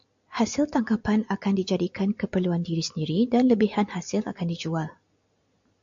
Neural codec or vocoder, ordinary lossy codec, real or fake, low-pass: none; AAC, 64 kbps; real; 7.2 kHz